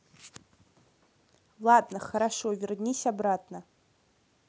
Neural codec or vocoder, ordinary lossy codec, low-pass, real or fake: none; none; none; real